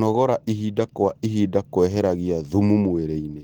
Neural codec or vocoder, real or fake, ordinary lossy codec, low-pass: none; real; Opus, 16 kbps; 19.8 kHz